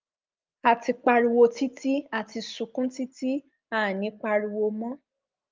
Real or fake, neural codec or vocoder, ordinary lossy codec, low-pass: real; none; Opus, 24 kbps; 7.2 kHz